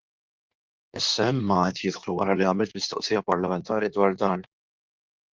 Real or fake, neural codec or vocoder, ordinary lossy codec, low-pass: fake; codec, 16 kHz in and 24 kHz out, 1.1 kbps, FireRedTTS-2 codec; Opus, 32 kbps; 7.2 kHz